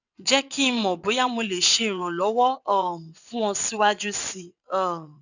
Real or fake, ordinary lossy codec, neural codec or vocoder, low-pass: fake; none; vocoder, 22.05 kHz, 80 mel bands, WaveNeXt; 7.2 kHz